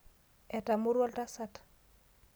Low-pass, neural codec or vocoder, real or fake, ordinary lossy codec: none; none; real; none